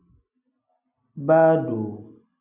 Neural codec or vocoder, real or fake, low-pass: none; real; 3.6 kHz